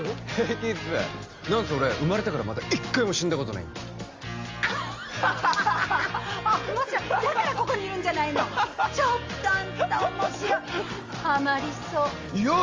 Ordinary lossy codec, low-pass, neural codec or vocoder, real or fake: Opus, 32 kbps; 7.2 kHz; none; real